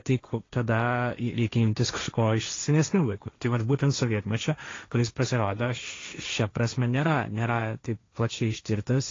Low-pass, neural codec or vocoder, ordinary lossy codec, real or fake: 7.2 kHz; codec, 16 kHz, 1.1 kbps, Voila-Tokenizer; AAC, 32 kbps; fake